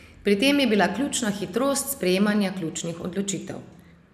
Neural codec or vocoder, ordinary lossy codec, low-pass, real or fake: none; none; 14.4 kHz; real